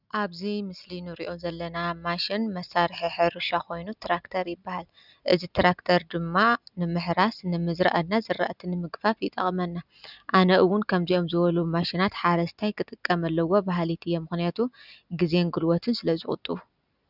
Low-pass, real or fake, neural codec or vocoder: 5.4 kHz; real; none